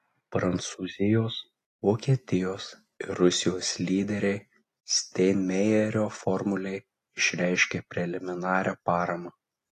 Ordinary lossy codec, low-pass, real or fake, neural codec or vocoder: AAC, 48 kbps; 14.4 kHz; real; none